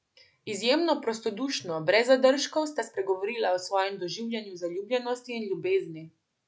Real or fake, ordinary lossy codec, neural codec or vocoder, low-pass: real; none; none; none